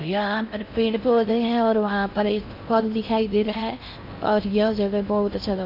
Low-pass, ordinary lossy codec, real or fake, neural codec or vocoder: 5.4 kHz; none; fake; codec, 16 kHz in and 24 kHz out, 0.6 kbps, FocalCodec, streaming, 4096 codes